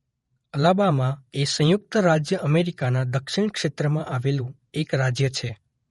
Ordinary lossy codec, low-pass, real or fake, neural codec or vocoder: MP3, 48 kbps; 19.8 kHz; fake; vocoder, 48 kHz, 128 mel bands, Vocos